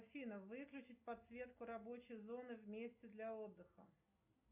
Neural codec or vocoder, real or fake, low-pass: none; real; 3.6 kHz